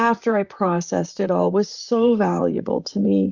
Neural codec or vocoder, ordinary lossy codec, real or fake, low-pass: vocoder, 44.1 kHz, 128 mel bands, Pupu-Vocoder; Opus, 64 kbps; fake; 7.2 kHz